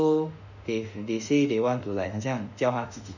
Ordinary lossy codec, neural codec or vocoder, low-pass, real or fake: none; autoencoder, 48 kHz, 32 numbers a frame, DAC-VAE, trained on Japanese speech; 7.2 kHz; fake